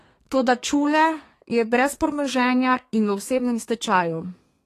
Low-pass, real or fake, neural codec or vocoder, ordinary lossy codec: 14.4 kHz; fake; codec, 32 kHz, 1.9 kbps, SNAC; AAC, 48 kbps